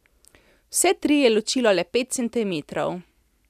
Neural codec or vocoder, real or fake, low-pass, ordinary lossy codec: none; real; 14.4 kHz; none